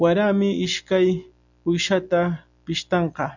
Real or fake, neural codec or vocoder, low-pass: real; none; 7.2 kHz